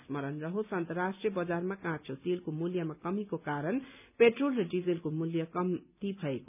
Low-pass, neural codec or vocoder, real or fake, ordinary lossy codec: 3.6 kHz; none; real; none